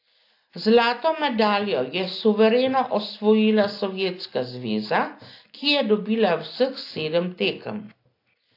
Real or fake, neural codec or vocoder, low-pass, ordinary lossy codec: real; none; 5.4 kHz; AAC, 32 kbps